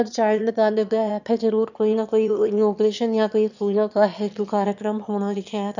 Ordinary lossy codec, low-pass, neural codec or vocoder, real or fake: none; 7.2 kHz; autoencoder, 22.05 kHz, a latent of 192 numbers a frame, VITS, trained on one speaker; fake